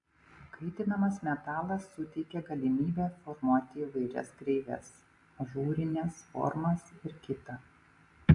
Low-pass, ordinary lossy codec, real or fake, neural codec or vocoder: 10.8 kHz; AAC, 64 kbps; real; none